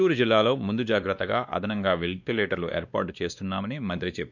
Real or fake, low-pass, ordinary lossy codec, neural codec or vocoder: fake; 7.2 kHz; none; codec, 16 kHz, 2 kbps, X-Codec, WavLM features, trained on Multilingual LibriSpeech